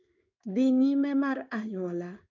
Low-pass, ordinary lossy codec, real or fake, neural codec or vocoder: 7.2 kHz; none; fake; codec, 16 kHz in and 24 kHz out, 1 kbps, XY-Tokenizer